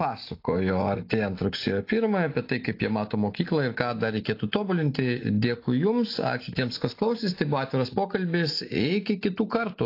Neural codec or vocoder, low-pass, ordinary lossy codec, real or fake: none; 5.4 kHz; AAC, 32 kbps; real